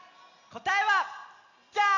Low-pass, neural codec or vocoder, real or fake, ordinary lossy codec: 7.2 kHz; none; real; none